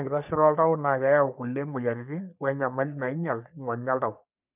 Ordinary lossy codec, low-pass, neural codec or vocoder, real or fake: none; 3.6 kHz; codec, 44.1 kHz, 3.4 kbps, Pupu-Codec; fake